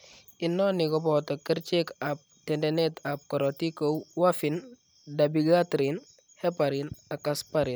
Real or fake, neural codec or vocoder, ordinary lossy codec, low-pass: real; none; none; none